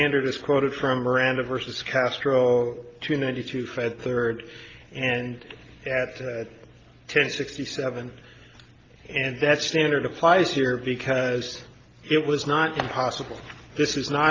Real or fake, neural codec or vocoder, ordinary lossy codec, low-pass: real; none; Opus, 24 kbps; 7.2 kHz